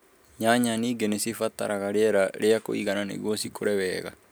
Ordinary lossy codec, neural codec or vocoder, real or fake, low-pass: none; none; real; none